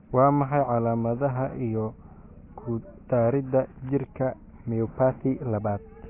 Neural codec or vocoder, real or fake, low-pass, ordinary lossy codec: none; real; 3.6 kHz; AAC, 24 kbps